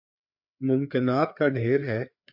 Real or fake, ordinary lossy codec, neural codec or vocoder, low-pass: fake; MP3, 48 kbps; codec, 16 kHz, 4 kbps, FreqCodec, larger model; 5.4 kHz